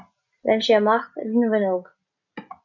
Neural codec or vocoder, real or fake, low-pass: none; real; 7.2 kHz